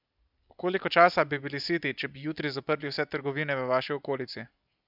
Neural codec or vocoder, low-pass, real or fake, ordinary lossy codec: none; 5.4 kHz; real; none